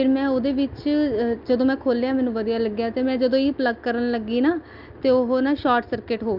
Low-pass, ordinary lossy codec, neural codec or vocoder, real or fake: 5.4 kHz; Opus, 24 kbps; none; real